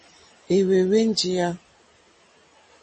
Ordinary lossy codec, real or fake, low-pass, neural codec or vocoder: MP3, 32 kbps; real; 10.8 kHz; none